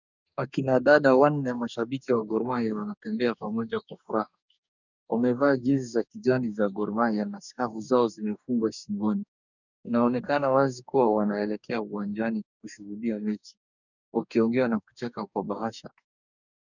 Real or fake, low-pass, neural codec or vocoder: fake; 7.2 kHz; codec, 44.1 kHz, 2.6 kbps, DAC